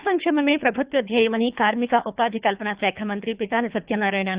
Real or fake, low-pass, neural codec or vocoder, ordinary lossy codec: fake; 3.6 kHz; codec, 24 kHz, 3 kbps, HILCodec; Opus, 64 kbps